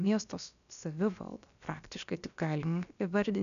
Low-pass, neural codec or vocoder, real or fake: 7.2 kHz; codec, 16 kHz, about 1 kbps, DyCAST, with the encoder's durations; fake